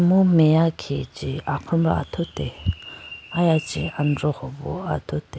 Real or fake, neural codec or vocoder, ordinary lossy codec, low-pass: real; none; none; none